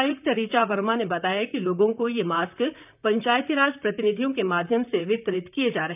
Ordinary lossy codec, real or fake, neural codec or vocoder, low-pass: none; fake; vocoder, 44.1 kHz, 128 mel bands, Pupu-Vocoder; 3.6 kHz